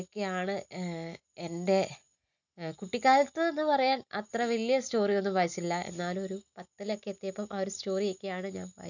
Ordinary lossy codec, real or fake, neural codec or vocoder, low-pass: none; real; none; 7.2 kHz